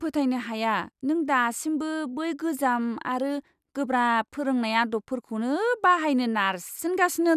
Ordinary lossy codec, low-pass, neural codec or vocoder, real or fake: none; 14.4 kHz; none; real